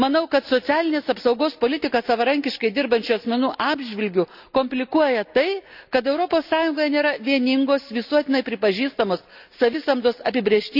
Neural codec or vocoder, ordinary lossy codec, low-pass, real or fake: none; none; 5.4 kHz; real